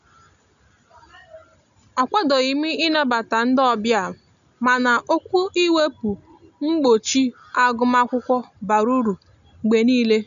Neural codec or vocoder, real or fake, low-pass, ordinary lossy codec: none; real; 7.2 kHz; none